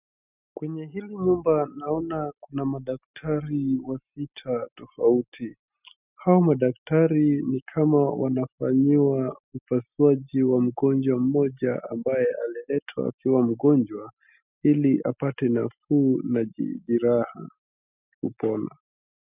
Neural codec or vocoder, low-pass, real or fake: none; 3.6 kHz; real